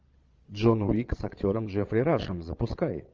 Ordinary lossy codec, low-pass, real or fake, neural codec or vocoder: Opus, 32 kbps; 7.2 kHz; fake; vocoder, 22.05 kHz, 80 mel bands, Vocos